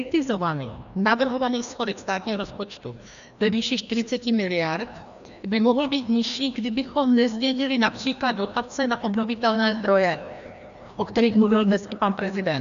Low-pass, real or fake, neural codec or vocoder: 7.2 kHz; fake; codec, 16 kHz, 1 kbps, FreqCodec, larger model